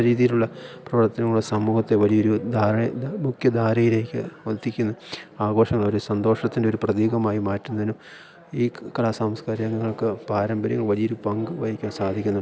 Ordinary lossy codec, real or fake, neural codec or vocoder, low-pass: none; real; none; none